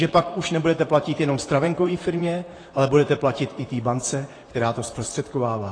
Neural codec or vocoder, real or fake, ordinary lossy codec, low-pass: vocoder, 44.1 kHz, 128 mel bands, Pupu-Vocoder; fake; AAC, 32 kbps; 9.9 kHz